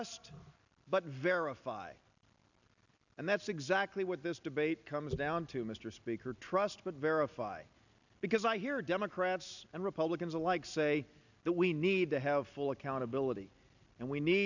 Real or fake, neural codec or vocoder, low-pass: real; none; 7.2 kHz